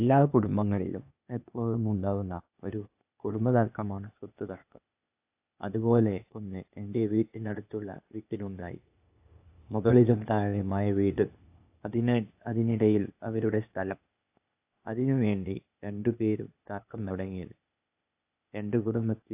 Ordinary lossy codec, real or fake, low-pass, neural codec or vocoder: AAC, 32 kbps; fake; 3.6 kHz; codec, 16 kHz, 0.8 kbps, ZipCodec